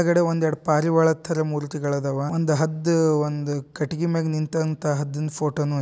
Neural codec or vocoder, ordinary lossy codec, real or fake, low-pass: none; none; real; none